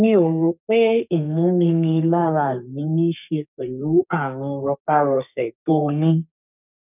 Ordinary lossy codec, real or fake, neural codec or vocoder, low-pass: none; fake; codec, 32 kHz, 1.9 kbps, SNAC; 3.6 kHz